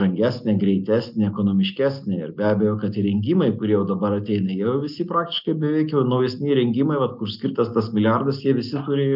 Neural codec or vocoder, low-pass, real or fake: none; 5.4 kHz; real